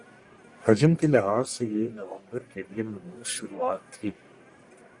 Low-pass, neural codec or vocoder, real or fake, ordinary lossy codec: 10.8 kHz; codec, 44.1 kHz, 1.7 kbps, Pupu-Codec; fake; Opus, 64 kbps